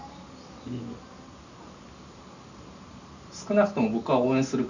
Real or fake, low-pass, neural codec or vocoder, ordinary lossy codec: real; 7.2 kHz; none; none